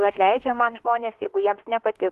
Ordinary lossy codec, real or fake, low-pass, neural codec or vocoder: Opus, 16 kbps; fake; 14.4 kHz; autoencoder, 48 kHz, 32 numbers a frame, DAC-VAE, trained on Japanese speech